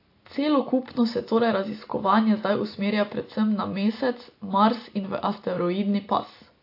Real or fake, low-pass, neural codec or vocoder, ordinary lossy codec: real; 5.4 kHz; none; AAC, 32 kbps